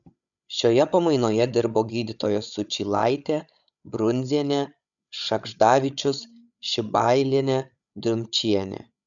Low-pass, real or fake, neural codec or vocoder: 7.2 kHz; fake; codec, 16 kHz, 8 kbps, FreqCodec, larger model